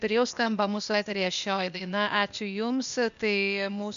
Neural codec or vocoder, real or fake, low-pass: codec, 16 kHz, 0.8 kbps, ZipCodec; fake; 7.2 kHz